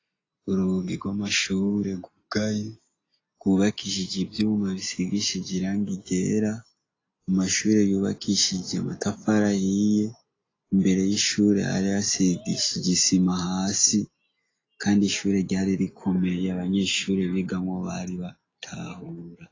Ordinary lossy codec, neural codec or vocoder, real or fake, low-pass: AAC, 32 kbps; none; real; 7.2 kHz